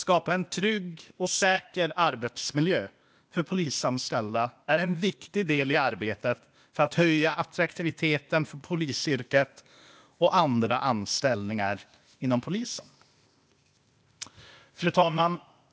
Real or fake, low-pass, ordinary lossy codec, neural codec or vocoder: fake; none; none; codec, 16 kHz, 0.8 kbps, ZipCodec